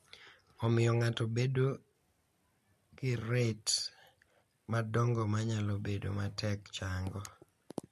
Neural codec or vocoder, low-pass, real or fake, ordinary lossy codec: none; 14.4 kHz; real; MP3, 64 kbps